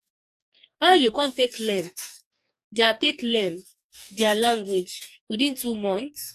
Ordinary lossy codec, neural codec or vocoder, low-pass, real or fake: AAC, 96 kbps; codec, 44.1 kHz, 2.6 kbps, DAC; 14.4 kHz; fake